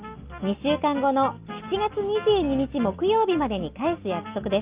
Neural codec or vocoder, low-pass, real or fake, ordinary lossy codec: none; 3.6 kHz; real; Opus, 24 kbps